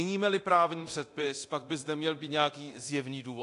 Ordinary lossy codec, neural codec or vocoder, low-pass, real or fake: AAC, 48 kbps; codec, 24 kHz, 0.9 kbps, DualCodec; 10.8 kHz; fake